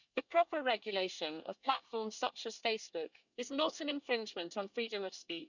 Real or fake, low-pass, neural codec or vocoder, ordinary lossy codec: fake; 7.2 kHz; codec, 24 kHz, 1 kbps, SNAC; none